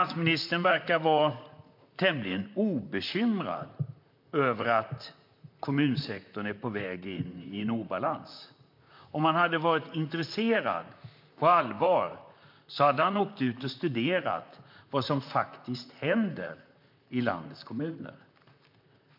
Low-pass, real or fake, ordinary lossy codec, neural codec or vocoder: 5.4 kHz; fake; MP3, 48 kbps; vocoder, 44.1 kHz, 128 mel bands, Pupu-Vocoder